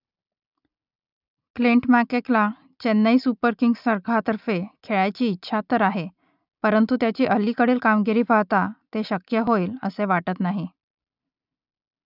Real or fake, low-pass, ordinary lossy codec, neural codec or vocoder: real; 5.4 kHz; none; none